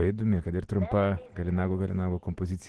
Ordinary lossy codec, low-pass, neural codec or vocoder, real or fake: Opus, 16 kbps; 10.8 kHz; none; real